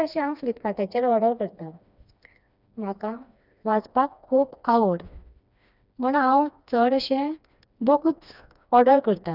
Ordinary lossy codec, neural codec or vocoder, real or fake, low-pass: none; codec, 16 kHz, 2 kbps, FreqCodec, smaller model; fake; 5.4 kHz